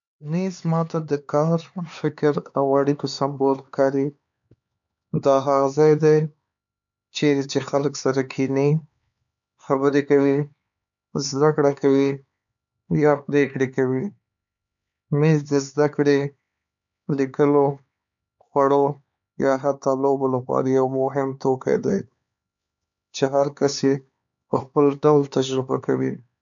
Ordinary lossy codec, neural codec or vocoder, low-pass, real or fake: none; codec, 16 kHz, 4 kbps, X-Codec, HuBERT features, trained on LibriSpeech; 7.2 kHz; fake